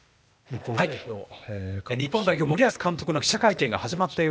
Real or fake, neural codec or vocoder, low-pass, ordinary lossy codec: fake; codec, 16 kHz, 0.8 kbps, ZipCodec; none; none